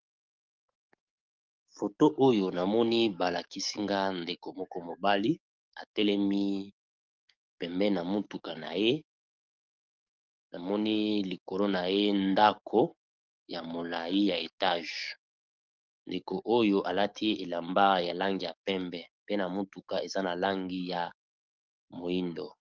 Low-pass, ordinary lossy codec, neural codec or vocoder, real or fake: 7.2 kHz; Opus, 24 kbps; codec, 44.1 kHz, 7.8 kbps, DAC; fake